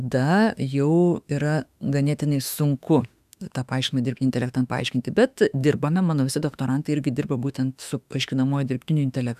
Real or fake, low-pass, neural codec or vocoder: fake; 14.4 kHz; autoencoder, 48 kHz, 32 numbers a frame, DAC-VAE, trained on Japanese speech